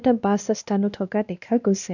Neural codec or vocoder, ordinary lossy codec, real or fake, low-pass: codec, 16 kHz, 1 kbps, X-Codec, WavLM features, trained on Multilingual LibriSpeech; none; fake; 7.2 kHz